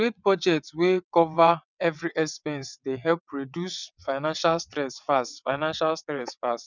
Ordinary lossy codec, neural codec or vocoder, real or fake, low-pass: none; vocoder, 22.05 kHz, 80 mel bands, WaveNeXt; fake; 7.2 kHz